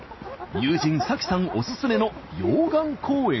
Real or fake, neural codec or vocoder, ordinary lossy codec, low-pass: real; none; MP3, 24 kbps; 7.2 kHz